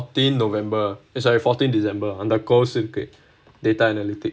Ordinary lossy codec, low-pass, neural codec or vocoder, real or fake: none; none; none; real